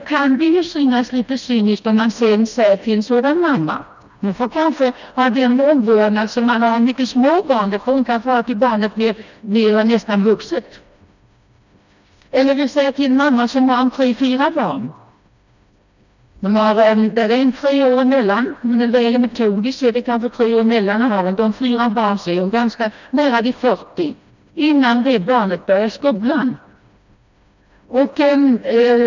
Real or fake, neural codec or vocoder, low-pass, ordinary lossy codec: fake; codec, 16 kHz, 1 kbps, FreqCodec, smaller model; 7.2 kHz; none